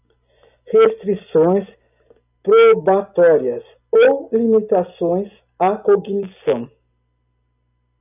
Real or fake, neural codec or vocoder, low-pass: real; none; 3.6 kHz